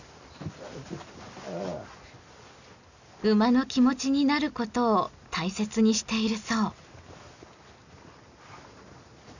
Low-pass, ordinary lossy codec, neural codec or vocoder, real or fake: 7.2 kHz; none; none; real